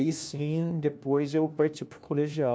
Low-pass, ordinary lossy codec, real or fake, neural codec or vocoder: none; none; fake; codec, 16 kHz, 1 kbps, FunCodec, trained on LibriTTS, 50 frames a second